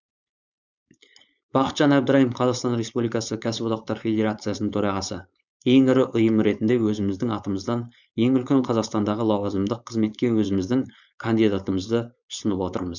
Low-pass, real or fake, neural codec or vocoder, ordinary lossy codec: 7.2 kHz; fake; codec, 16 kHz, 4.8 kbps, FACodec; none